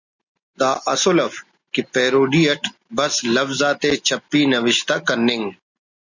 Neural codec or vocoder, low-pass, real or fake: none; 7.2 kHz; real